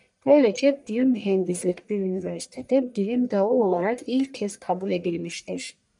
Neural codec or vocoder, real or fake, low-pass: codec, 44.1 kHz, 1.7 kbps, Pupu-Codec; fake; 10.8 kHz